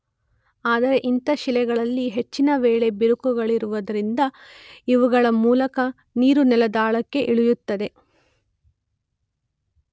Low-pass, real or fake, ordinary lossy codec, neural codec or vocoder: none; real; none; none